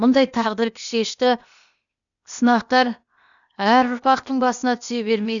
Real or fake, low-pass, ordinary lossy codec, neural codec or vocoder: fake; 7.2 kHz; none; codec, 16 kHz, 0.8 kbps, ZipCodec